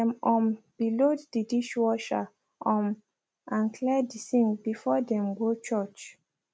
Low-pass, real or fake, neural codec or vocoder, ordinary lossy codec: none; real; none; none